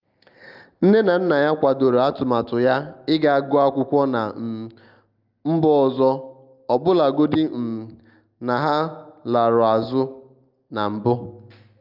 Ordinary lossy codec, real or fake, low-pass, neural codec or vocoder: Opus, 24 kbps; real; 5.4 kHz; none